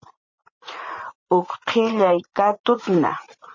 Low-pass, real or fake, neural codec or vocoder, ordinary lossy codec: 7.2 kHz; fake; vocoder, 44.1 kHz, 80 mel bands, Vocos; MP3, 32 kbps